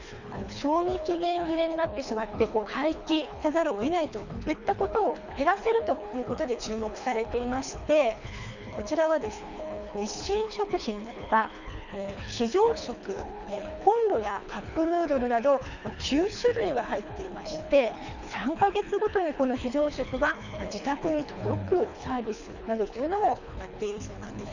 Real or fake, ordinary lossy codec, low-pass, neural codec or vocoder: fake; none; 7.2 kHz; codec, 24 kHz, 3 kbps, HILCodec